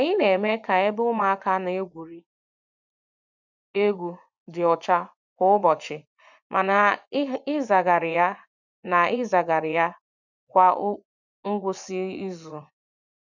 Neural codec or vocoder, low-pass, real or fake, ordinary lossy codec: vocoder, 24 kHz, 100 mel bands, Vocos; 7.2 kHz; fake; none